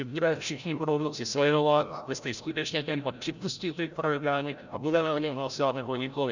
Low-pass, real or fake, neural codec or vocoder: 7.2 kHz; fake; codec, 16 kHz, 0.5 kbps, FreqCodec, larger model